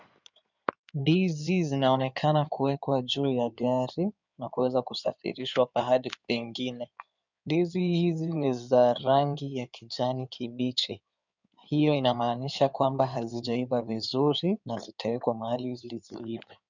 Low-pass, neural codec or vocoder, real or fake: 7.2 kHz; codec, 16 kHz in and 24 kHz out, 2.2 kbps, FireRedTTS-2 codec; fake